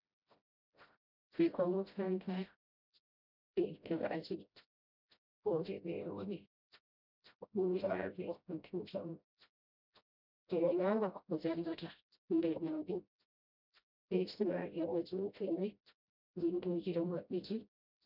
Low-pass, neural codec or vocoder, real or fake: 5.4 kHz; codec, 16 kHz, 0.5 kbps, FreqCodec, smaller model; fake